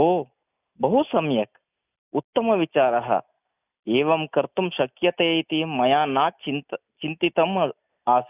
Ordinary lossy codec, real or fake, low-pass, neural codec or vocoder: none; real; 3.6 kHz; none